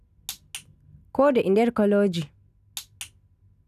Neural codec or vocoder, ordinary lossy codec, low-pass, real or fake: none; none; 14.4 kHz; real